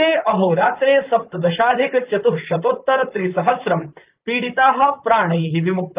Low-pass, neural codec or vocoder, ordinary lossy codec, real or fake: 3.6 kHz; vocoder, 44.1 kHz, 128 mel bands, Pupu-Vocoder; Opus, 24 kbps; fake